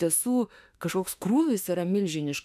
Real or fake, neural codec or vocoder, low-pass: fake; autoencoder, 48 kHz, 32 numbers a frame, DAC-VAE, trained on Japanese speech; 14.4 kHz